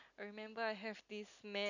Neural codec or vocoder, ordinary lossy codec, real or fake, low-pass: none; none; real; 7.2 kHz